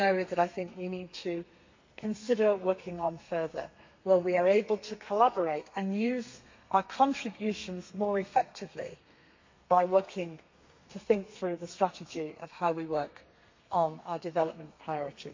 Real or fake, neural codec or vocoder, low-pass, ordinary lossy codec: fake; codec, 32 kHz, 1.9 kbps, SNAC; 7.2 kHz; MP3, 48 kbps